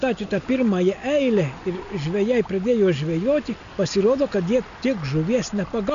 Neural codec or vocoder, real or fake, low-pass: none; real; 7.2 kHz